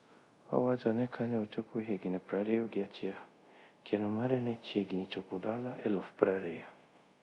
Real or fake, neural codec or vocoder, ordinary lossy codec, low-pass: fake; codec, 24 kHz, 0.5 kbps, DualCodec; Opus, 64 kbps; 10.8 kHz